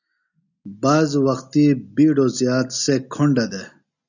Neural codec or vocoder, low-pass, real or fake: none; 7.2 kHz; real